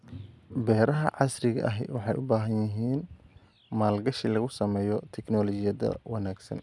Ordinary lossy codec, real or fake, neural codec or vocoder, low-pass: none; real; none; none